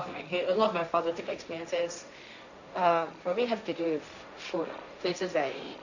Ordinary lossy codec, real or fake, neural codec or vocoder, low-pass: none; fake; codec, 16 kHz, 1.1 kbps, Voila-Tokenizer; 7.2 kHz